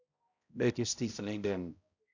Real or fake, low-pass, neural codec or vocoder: fake; 7.2 kHz; codec, 16 kHz, 0.5 kbps, X-Codec, HuBERT features, trained on balanced general audio